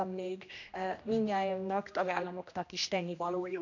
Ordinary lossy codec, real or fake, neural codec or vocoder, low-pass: none; fake; codec, 16 kHz, 1 kbps, X-Codec, HuBERT features, trained on general audio; 7.2 kHz